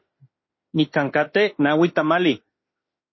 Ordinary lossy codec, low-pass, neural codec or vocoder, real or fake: MP3, 24 kbps; 7.2 kHz; autoencoder, 48 kHz, 32 numbers a frame, DAC-VAE, trained on Japanese speech; fake